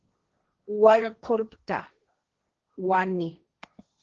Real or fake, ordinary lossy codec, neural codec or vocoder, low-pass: fake; Opus, 16 kbps; codec, 16 kHz, 1.1 kbps, Voila-Tokenizer; 7.2 kHz